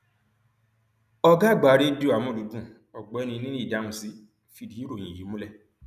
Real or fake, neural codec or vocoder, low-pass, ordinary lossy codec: real; none; 14.4 kHz; none